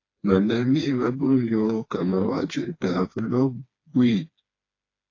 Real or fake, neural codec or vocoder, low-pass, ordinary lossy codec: fake; codec, 16 kHz, 2 kbps, FreqCodec, smaller model; 7.2 kHz; AAC, 32 kbps